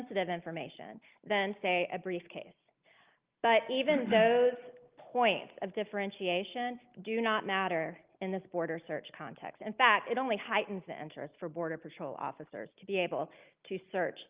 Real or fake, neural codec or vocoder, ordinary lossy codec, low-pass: real; none; Opus, 24 kbps; 3.6 kHz